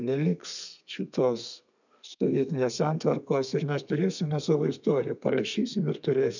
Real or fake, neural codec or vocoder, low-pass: fake; codec, 32 kHz, 1.9 kbps, SNAC; 7.2 kHz